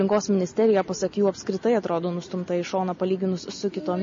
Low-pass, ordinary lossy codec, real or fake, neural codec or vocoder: 7.2 kHz; MP3, 32 kbps; real; none